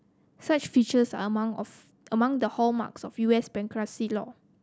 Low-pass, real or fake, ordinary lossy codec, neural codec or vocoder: none; real; none; none